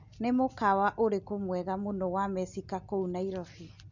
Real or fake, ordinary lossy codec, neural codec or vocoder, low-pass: real; none; none; 7.2 kHz